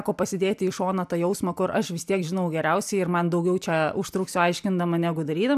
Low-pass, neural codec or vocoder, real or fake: 14.4 kHz; none; real